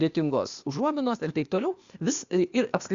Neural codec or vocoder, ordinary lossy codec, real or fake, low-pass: codec, 16 kHz, 0.8 kbps, ZipCodec; Opus, 64 kbps; fake; 7.2 kHz